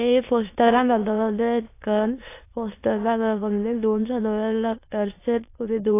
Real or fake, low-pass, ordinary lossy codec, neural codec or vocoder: fake; 3.6 kHz; AAC, 24 kbps; autoencoder, 22.05 kHz, a latent of 192 numbers a frame, VITS, trained on many speakers